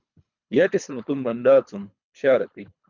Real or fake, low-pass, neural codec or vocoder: fake; 7.2 kHz; codec, 24 kHz, 3 kbps, HILCodec